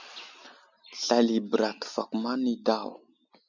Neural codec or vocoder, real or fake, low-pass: none; real; 7.2 kHz